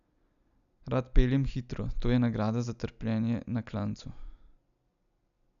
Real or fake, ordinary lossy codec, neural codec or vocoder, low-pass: real; none; none; 7.2 kHz